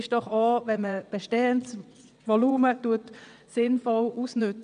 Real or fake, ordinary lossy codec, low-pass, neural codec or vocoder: fake; none; 9.9 kHz; vocoder, 22.05 kHz, 80 mel bands, WaveNeXt